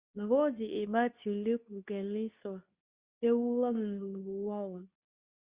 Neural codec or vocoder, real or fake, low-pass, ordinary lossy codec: codec, 24 kHz, 0.9 kbps, WavTokenizer, medium speech release version 2; fake; 3.6 kHz; Opus, 64 kbps